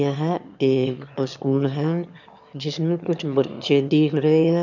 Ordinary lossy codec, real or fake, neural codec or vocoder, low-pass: none; fake; autoencoder, 22.05 kHz, a latent of 192 numbers a frame, VITS, trained on one speaker; 7.2 kHz